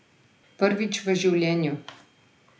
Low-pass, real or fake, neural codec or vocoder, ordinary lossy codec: none; real; none; none